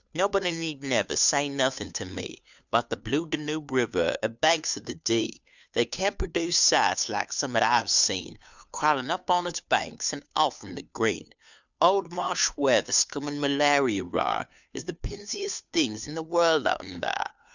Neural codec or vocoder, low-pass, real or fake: codec, 16 kHz, 2 kbps, FunCodec, trained on Chinese and English, 25 frames a second; 7.2 kHz; fake